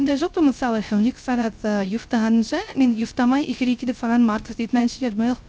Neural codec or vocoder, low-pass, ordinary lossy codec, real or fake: codec, 16 kHz, 0.3 kbps, FocalCodec; none; none; fake